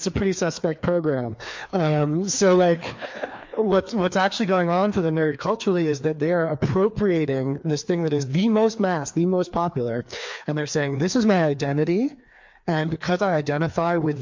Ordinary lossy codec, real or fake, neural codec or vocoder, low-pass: MP3, 48 kbps; fake; codec, 16 kHz, 2 kbps, FreqCodec, larger model; 7.2 kHz